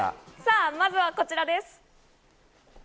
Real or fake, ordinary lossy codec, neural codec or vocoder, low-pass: real; none; none; none